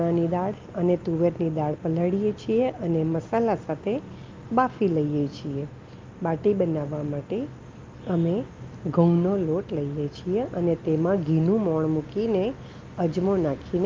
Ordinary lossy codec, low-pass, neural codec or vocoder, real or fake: Opus, 32 kbps; 7.2 kHz; none; real